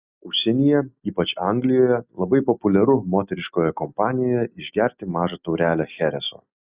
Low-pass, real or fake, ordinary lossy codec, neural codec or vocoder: 3.6 kHz; real; Opus, 64 kbps; none